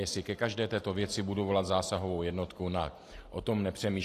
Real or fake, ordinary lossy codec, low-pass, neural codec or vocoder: real; AAC, 48 kbps; 14.4 kHz; none